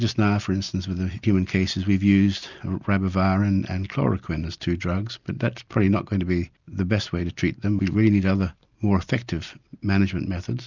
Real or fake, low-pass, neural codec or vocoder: real; 7.2 kHz; none